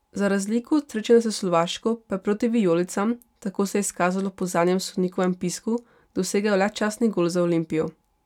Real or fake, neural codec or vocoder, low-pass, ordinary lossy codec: real; none; 19.8 kHz; none